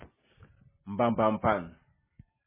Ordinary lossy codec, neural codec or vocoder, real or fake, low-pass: MP3, 16 kbps; none; real; 3.6 kHz